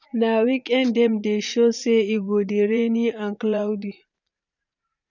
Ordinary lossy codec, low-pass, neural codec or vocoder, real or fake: none; 7.2 kHz; vocoder, 44.1 kHz, 80 mel bands, Vocos; fake